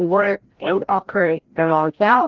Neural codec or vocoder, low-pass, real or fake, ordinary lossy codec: codec, 16 kHz, 0.5 kbps, FreqCodec, larger model; 7.2 kHz; fake; Opus, 16 kbps